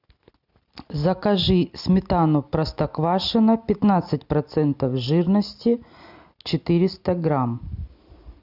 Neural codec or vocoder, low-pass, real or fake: none; 5.4 kHz; real